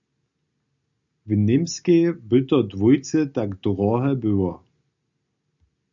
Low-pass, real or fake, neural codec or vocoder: 7.2 kHz; real; none